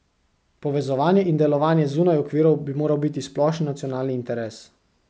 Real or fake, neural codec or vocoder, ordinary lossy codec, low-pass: real; none; none; none